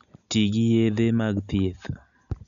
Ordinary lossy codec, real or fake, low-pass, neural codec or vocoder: none; real; 7.2 kHz; none